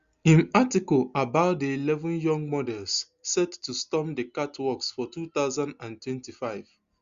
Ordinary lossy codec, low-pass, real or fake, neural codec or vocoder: Opus, 64 kbps; 7.2 kHz; real; none